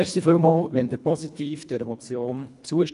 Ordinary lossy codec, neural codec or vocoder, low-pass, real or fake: none; codec, 24 kHz, 1.5 kbps, HILCodec; 10.8 kHz; fake